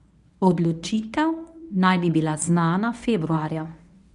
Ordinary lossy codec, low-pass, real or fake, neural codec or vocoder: none; 10.8 kHz; fake; codec, 24 kHz, 0.9 kbps, WavTokenizer, medium speech release version 1